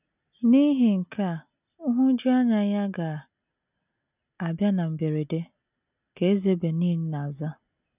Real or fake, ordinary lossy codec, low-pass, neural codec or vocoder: real; none; 3.6 kHz; none